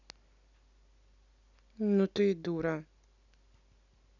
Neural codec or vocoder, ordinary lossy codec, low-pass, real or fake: none; none; 7.2 kHz; real